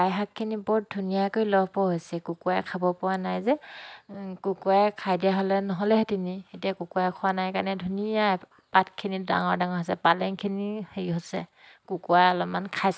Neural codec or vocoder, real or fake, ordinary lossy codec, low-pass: none; real; none; none